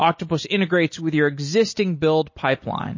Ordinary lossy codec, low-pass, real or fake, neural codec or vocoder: MP3, 32 kbps; 7.2 kHz; real; none